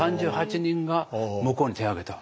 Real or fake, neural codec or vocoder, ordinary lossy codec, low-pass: real; none; none; none